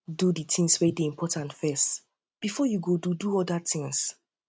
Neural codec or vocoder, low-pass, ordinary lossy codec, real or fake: none; none; none; real